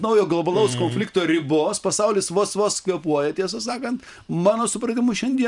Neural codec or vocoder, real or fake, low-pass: vocoder, 44.1 kHz, 128 mel bands every 512 samples, BigVGAN v2; fake; 10.8 kHz